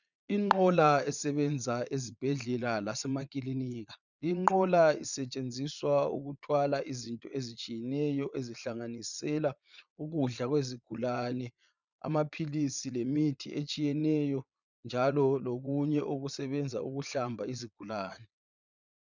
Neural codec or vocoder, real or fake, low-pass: vocoder, 22.05 kHz, 80 mel bands, Vocos; fake; 7.2 kHz